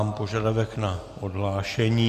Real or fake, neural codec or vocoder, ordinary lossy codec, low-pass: real; none; AAC, 96 kbps; 14.4 kHz